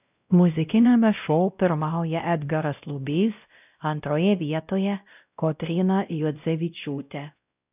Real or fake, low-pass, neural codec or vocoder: fake; 3.6 kHz; codec, 16 kHz, 0.5 kbps, X-Codec, WavLM features, trained on Multilingual LibriSpeech